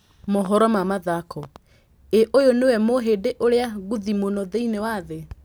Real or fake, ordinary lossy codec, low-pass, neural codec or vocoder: real; none; none; none